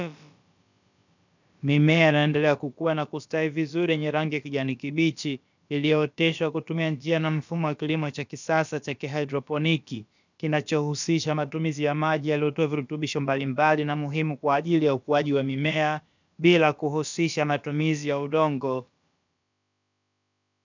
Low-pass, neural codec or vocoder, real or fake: 7.2 kHz; codec, 16 kHz, about 1 kbps, DyCAST, with the encoder's durations; fake